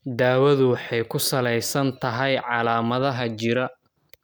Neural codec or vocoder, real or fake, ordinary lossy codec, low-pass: none; real; none; none